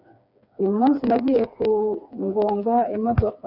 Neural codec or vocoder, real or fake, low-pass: codec, 16 kHz, 4 kbps, FreqCodec, smaller model; fake; 5.4 kHz